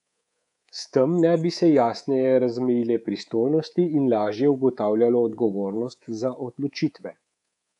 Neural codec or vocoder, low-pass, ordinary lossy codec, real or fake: codec, 24 kHz, 3.1 kbps, DualCodec; 10.8 kHz; MP3, 96 kbps; fake